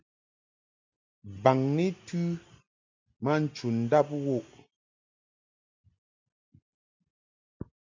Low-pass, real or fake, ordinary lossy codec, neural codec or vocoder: 7.2 kHz; real; MP3, 64 kbps; none